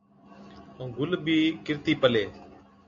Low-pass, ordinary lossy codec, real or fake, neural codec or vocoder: 7.2 kHz; AAC, 48 kbps; real; none